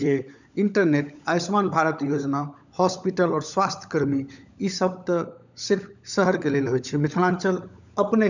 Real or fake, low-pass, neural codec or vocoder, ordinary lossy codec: fake; 7.2 kHz; codec, 16 kHz, 16 kbps, FunCodec, trained on LibriTTS, 50 frames a second; none